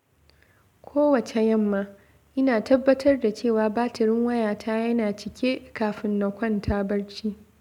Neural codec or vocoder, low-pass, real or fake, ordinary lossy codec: none; 19.8 kHz; real; MP3, 96 kbps